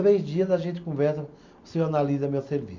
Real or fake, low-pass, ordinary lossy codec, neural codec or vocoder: real; 7.2 kHz; MP3, 48 kbps; none